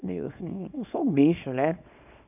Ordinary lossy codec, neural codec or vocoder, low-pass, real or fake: none; codec, 24 kHz, 0.9 kbps, WavTokenizer, small release; 3.6 kHz; fake